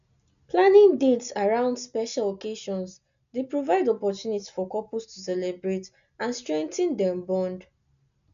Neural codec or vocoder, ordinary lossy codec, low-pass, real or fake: none; none; 7.2 kHz; real